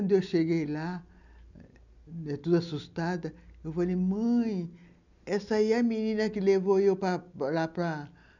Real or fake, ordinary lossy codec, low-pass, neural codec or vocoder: real; none; 7.2 kHz; none